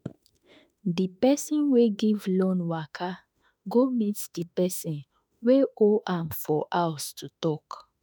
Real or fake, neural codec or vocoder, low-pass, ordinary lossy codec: fake; autoencoder, 48 kHz, 32 numbers a frame, DAC-VAE, trained on Japanese speech; none; none